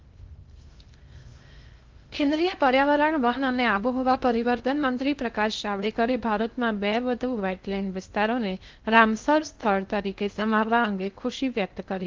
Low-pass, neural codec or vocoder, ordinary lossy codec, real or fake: 7.2 kHz; codec, 16 kHz in and 24 kHz out, 0.6 kbps, FocalCodec, streaming, 2048 codes; Opus, 32 kbps; fake